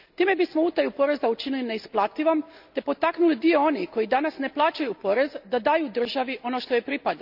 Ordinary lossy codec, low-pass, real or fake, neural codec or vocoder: none; 5.4 kHz; real; none